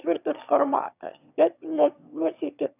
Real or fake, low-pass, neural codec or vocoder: fake; 3.6 kHz; autoencoder, 22.05 kHz, a latent of 192 numbers a frame, VITS, trained on one speaker